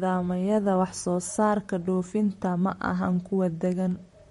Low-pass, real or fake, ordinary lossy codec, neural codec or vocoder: 19.8 kHz; fake; MP3, 48 kbps; vocoder, 44.1 kHz, 128 mel bands, Pupu-Vocoder